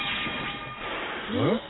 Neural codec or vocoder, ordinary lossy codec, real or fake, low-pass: none; AAC, 16 kbps; real; 7.2 kHz